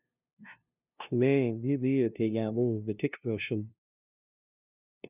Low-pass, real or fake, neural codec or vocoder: 3.6 kHz; fake; codec, 16 kHz, 0.5 kbps, FunCodec, trained on LibriTTS, 25 frames a second